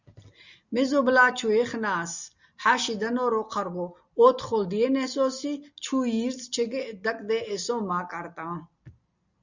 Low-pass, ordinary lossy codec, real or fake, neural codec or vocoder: 7.2 kHz; Opus, 64 kbps; real; none